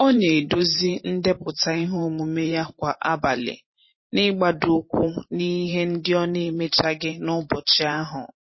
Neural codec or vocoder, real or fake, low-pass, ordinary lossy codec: vocoder, 44.1 kHz, 128 mel bands every 512 samples, BigVGAN v2; fake; 7.2 kHz; MP3, 24 kbps